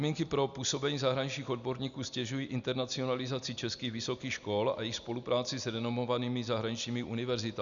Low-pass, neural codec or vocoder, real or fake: 7.2 kHz; none; real